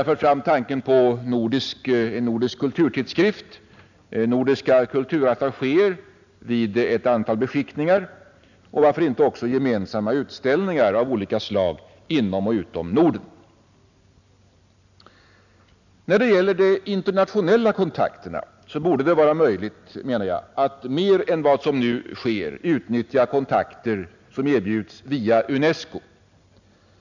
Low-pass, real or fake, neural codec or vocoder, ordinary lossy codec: 7.2 kHz; real; none; none